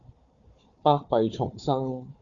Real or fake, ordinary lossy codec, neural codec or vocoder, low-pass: fake; Opus, 64 kbps; codec, 16 kHz, 4 kbps, FunCodec, trained on Chinese and English, 50 frames a second; 7.2 kHz